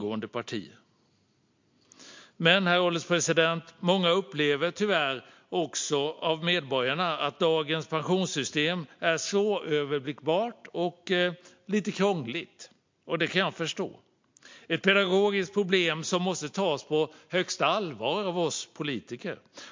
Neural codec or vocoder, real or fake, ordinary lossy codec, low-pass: none; real; MP3, 48 kbps; 7.2 kHz